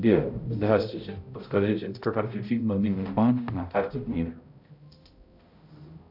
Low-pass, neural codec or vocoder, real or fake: 5.4 kHz; codec, 16 kHz, 0.5 kbps, X-Codec, HuBERT features, trained on balanced general audio; fake